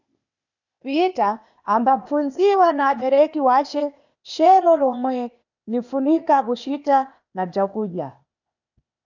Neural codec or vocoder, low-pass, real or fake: codec, 16 kHz, 0.8 kbps, ZipCodec; 7.2 kHz; fake